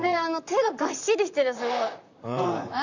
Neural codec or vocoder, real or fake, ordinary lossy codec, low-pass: vocoder, 44.1 kHz, 128 mel bands, Pupu-Vocoder; fake; none; 7.2 kHz